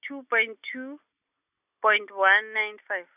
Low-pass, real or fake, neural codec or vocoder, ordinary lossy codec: 3.6 kHz; real; none; none